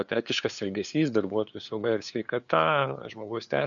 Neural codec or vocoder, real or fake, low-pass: codec, 16 kHz, 2 kbps, FunCodec, trained on LibriTTS, 25 frames a second; fake; 7.2 kHz